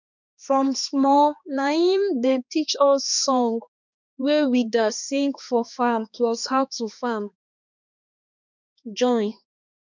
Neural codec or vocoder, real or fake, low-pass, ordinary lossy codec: codec, 16 kHz, 2 kbps, X-Codec, HuBERT features, trained on balanced general audio; fake; 7.2 kHz; none